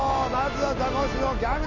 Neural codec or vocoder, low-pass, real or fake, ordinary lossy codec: none; 7.2 kHz; real; none